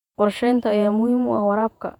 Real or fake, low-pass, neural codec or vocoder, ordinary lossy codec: fake; 19.8 kHz; vocoder, 48 kHz, 128 mel bands, Vocos; none